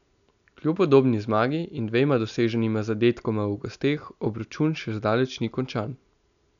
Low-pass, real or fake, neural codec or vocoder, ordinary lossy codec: 7.2 kHz; real; none; none